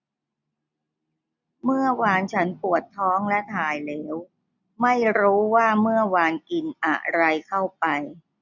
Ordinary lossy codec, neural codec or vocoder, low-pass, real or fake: none; none; 7.2 kHz; real